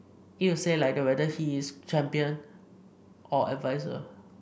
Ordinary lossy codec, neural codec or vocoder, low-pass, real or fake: none; none; none; real